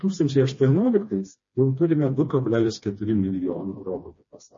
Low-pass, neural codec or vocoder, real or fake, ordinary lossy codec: 7.2 kHz; codec, 16 kHz, 2 kbps, FreqCodec, smaller model; fake; MP3, 32 kbps